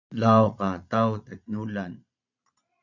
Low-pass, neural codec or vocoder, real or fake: 7.2 kHz; vocoder, 22.05 kHz, 80 mel bands, Vocos; fake